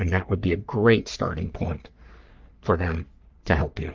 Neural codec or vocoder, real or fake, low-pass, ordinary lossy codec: codec, 44.1 kHz, 3.4 kbps, Pupu-Codec; fake; 7.2 kHz; Opus, 32 kbps